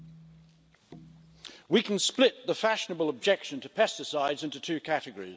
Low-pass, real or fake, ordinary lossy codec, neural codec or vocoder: none; real; none; none